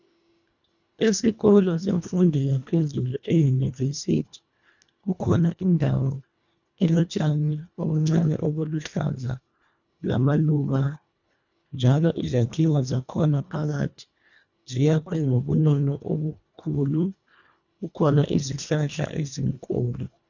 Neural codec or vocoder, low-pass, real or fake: codec, 24 kHz, 1.5 kbps, HILCodec; 7.2 kHz; fake